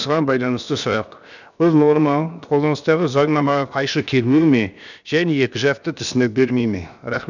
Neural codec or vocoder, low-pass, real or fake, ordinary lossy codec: codec, 16 kHz, about 1 kbps, DyCAST, with the encoder's durations; 7.2 kHz; fake; none